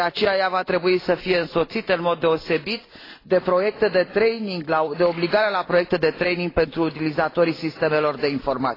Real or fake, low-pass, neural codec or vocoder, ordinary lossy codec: real; 5.4 kHz; none; AAC, 24 kbps